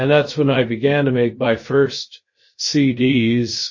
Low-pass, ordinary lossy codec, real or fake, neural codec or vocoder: 7.2 kHz; MP3, 32 kbps; fake; codec, 16 kHz, 0.3 kbps, FocalCodec